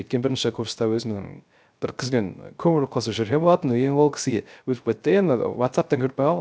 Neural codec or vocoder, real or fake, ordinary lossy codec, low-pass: codec, 16 kHz, 0.3 kbps, FocalCodec; fake; none; none